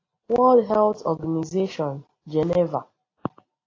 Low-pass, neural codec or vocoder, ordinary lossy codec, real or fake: 7.2 kHz; none; AAC, 32 kbps; real